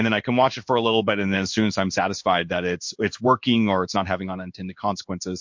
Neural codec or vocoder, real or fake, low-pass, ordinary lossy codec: codec, 16 kHz in and 24 kHz out, 1 kbps, XY-Tokenizer; fake; 7.2 kHz; MP3, 48 kbps